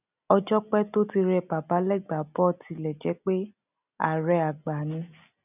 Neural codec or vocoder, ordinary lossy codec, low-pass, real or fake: none; none; 3.6 kHz; real